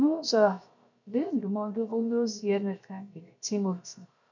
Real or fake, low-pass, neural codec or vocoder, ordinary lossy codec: fake; 7.2 kHz; codec, 16 kHz, 0.7 kbps, FocalCodec; none